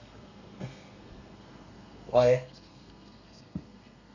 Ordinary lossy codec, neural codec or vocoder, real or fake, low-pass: none; codec, 32 kHz, 1.9 kbps, SNAC; fake; 7.2 kHz